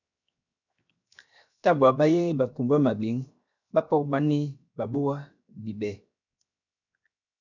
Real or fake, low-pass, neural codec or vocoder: fake; 7.2 kHz; codec, 16 kHz, 0.7 kbps, FocalCodec